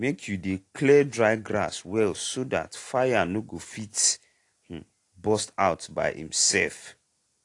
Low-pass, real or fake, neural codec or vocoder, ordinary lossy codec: 10.8 kHz; real; none; AAC, 48 kbps